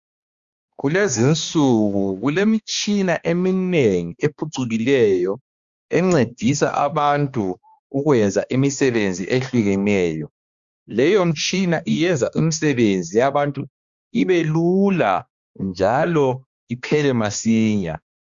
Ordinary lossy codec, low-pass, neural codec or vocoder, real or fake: Opus, 64 kbps; 7.2 kHz; codec, 16 kHz, 2 kbps, X-Codec, HuBERT features, trained on balanced general audio; fake